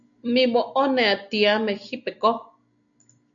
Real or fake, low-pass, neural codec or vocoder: real; 7.2 kHz; none